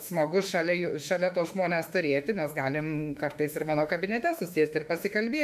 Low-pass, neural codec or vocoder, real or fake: 14.4 kHz; autoencoder, 48 kHz, 32 numbers a frame, DAC-VAE, trained on Japanese speech; fake